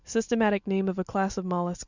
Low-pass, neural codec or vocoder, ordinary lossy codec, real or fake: 7.2 kHz; none; Opus, 64 kbps; real